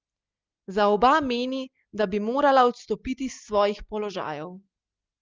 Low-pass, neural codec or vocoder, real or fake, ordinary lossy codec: 7.2 kHz; none; real; Opus, 32 kbps